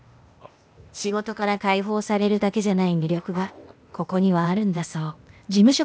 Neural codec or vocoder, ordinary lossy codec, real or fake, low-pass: codec, 16 kHz, 0.8 kbps, ZipCodec; none; fake; none